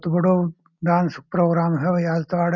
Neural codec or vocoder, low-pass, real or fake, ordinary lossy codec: none; 7.2 kHz; real; none